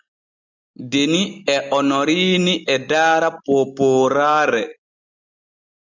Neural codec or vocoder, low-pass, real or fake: none; 7.2 kHz; real